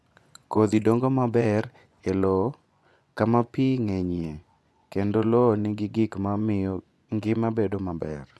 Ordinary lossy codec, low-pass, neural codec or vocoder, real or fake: none; none; vocoder, 24 kHz, 100 mel bands, Vocos; fake